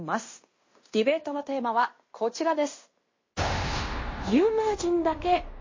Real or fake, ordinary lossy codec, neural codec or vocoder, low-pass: fake; MP3, 32 kbps; codec, 16 kHz in and 24 kHz out, 0.9 kbps, LongCat-Audio-Codec, fine tuned four codebook decoder; 7.2 kHz